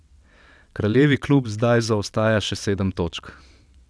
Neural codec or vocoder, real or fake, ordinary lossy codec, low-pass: vocoder, 22.05 kHz, 80 mel bands, WaveNeXt; fake; none; none